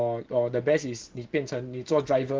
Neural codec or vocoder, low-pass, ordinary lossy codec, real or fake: none; 7.2 kHz; Opus, 16 kbps; real